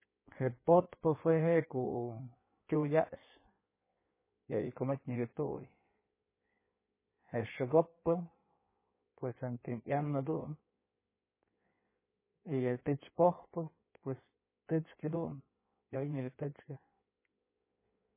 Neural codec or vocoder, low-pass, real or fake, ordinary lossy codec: codec, 16 kHz in and 24 kHz out, 1.1 kbps, FireRedTTS-2 codec; 3.6 kHz; fake; MP3, 16 kbps